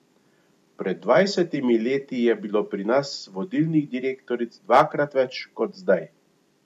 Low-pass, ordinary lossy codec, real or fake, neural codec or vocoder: 14.4 kHz; MP3, 64 kbps; real; none